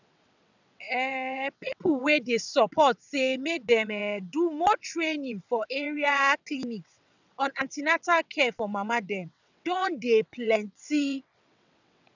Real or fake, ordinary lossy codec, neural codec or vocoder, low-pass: fake; none; vocoder, 22.05 kHz, 80 mel bands, WaveNeXt; 7.2 kHz